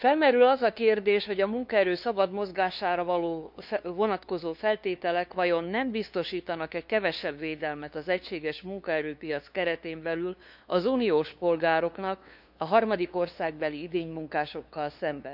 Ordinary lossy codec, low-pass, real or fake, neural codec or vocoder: none; 5.4 kHz; fake; codec, 16 kHz, 2 kbps, FunCodec, trained on LibriTTS, 25 frames a second